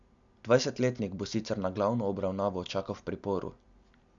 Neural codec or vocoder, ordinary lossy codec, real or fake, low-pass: none; Opus, 64 kbps; real; 7.2 kHz